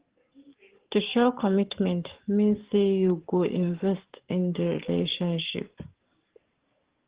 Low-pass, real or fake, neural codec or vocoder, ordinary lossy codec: 3.6 kHz; fake; codec, 44.1 kHz, 7.8 kbps, DAC; Opus, 16 kbps